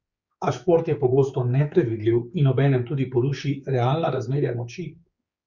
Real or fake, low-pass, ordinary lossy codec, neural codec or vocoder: fake; 7.2 kHz; Opus, 64 kbps; codec, 16 kHz, 4 kbps, X-Codec, HuBERT features, trained on general audio